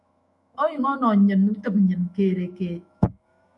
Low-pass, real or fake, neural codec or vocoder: 10.8 kHz; fake; autoencoder, 48 kHz, 128 numbers a frame, DAC-VAE, trained on Japanese speech